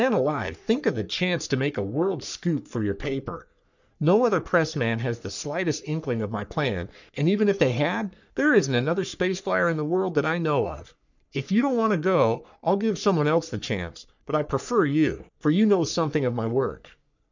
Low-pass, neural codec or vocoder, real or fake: 7.2 kHz; codec, 44.1 kHz, 3.4 kbps, Pupu-Codec; fake